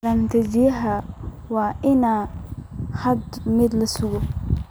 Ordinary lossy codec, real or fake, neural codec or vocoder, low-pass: none; real; none; none